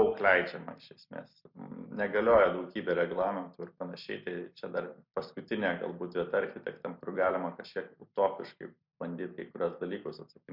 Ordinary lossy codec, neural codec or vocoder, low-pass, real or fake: MP3, 48 kbps; none; 5.4 kHz; real